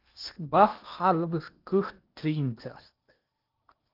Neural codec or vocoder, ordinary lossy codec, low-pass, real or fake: codec, 16 kHz in and 24 kHz out, 0.8 kbps, FocalCodec, streaming, 65536 codes; Opus, 24 kbps; 5.4 kHz; fake